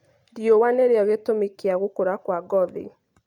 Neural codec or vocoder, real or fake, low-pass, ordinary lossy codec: none; real; 19.8 kHz; none